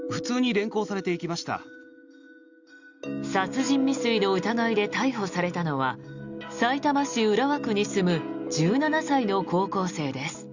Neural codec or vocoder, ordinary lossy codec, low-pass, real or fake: none; Opus, 64 kbps; 7.2 kHz; real